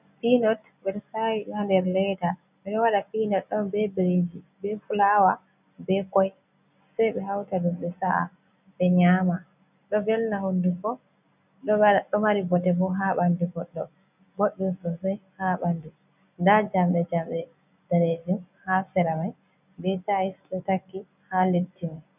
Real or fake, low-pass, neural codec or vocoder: real; 3.6 kHz; none